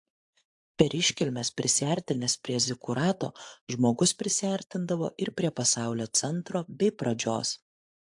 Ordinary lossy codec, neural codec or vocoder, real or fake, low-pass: AAC, 64 kbps; vocoder, 24 kHz, 100 mel bands, Vocos; fake; 10.8 kHz